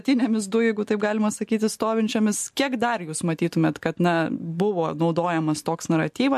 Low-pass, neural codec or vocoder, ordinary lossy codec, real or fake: 14.4 kHz; none; MP3, 64 kbps; real